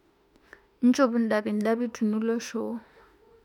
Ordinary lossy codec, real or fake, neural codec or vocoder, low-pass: none; fake; autoencoder, 48 kHz, 32 numbers a frame, DAC-VAE, trained on Japanese speech; 19.8 kHz